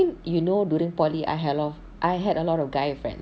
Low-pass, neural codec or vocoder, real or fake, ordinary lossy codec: none; none; real; none